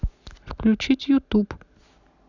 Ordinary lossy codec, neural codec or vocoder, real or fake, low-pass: none; none; real; 7.2 kHz